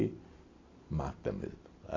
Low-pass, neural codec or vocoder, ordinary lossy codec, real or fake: 7.2 kHz; none; AAC, 32 kbps; real